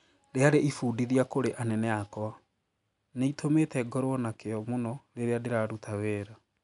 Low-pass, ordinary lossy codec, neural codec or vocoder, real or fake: 10.8 kHz; none; none; real